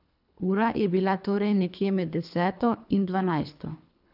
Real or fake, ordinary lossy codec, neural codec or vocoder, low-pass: fake; none; codec, 24 kHz, 3 kbps, HILCodec; 5.4 kHz